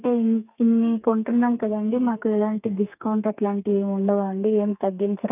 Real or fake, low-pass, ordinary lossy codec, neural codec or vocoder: fake; 3.6 kHz; none; codec, 32 kHz, 1.9 kbps, SNAC